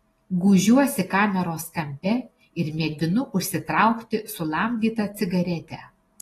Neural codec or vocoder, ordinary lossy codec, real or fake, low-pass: none; AAC, 32 kbps; real; 19.8 kHz